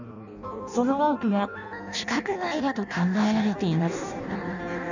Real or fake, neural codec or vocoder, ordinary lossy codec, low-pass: fake; codec, 16 kHz in and 24 kHz out, 0.6 kbps, FireRedTTS-2 codec; none; 7.2 kHz